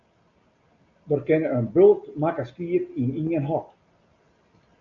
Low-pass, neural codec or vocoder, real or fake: 7.2 kHz; none; real